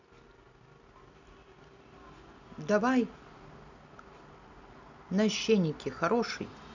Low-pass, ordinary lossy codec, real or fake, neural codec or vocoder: 7.2 kHz; none; real; none